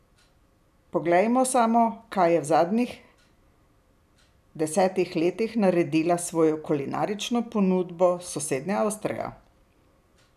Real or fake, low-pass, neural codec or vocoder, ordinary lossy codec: real; 14.4 kHz; none; none